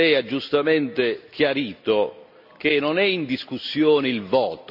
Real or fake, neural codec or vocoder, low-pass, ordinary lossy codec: real; none; 5.4 kHz; AAC, 48 kbps